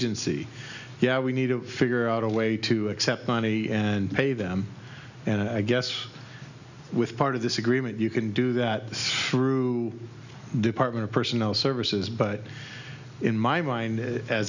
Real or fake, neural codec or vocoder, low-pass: real; none; 7.2 kHz